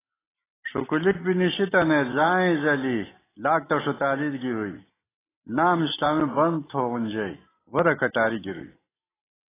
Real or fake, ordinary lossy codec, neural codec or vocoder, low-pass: real; AAC, 16 kbps; none; 3.6 kHz